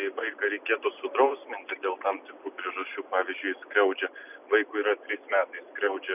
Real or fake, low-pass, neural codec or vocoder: real; 3.6 kHz; none